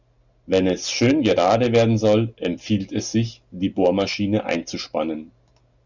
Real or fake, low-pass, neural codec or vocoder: real; 7.2 kHz; none